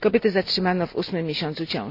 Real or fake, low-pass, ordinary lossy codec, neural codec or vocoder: real; 5.4 kHz; none; none